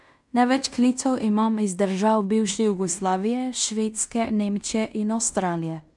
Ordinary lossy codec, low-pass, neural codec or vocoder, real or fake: none; 10.8 kHz; codec, 16 kHz in and 24 kHz out, 0.9 kbps, LongCat-Audio-Codec, fine tuned four codebook decoder; fake